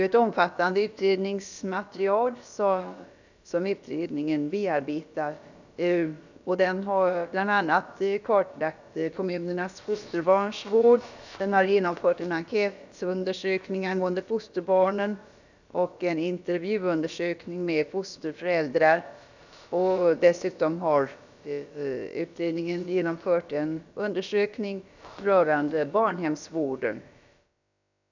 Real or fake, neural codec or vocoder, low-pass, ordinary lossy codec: fake; codec, 16 kHz, about 1 kbps, DyCAST, with the encoder's durations; 7.2 kHz; none